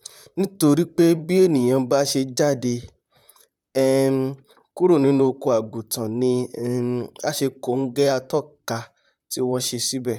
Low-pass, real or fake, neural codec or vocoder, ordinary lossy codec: 19.8 kHz; fake; vocoder, 44.1 kHz, 128 mel bands, Pupu-Vocoder; none